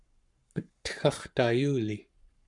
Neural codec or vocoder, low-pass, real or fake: codec, 44.1 kHz, 7.8 kbps, Pupu-Codec; 10.8 kHz; fake